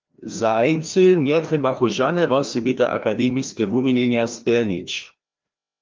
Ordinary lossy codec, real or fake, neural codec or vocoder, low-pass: Opus, 24 kbps; fake; codec, 16 kHz, 1 kbps, FreqCodec, larger model; 7.2 kHz